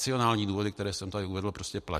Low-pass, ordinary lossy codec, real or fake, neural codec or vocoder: 14.4 kHz; MP3, 64 kbps; real; none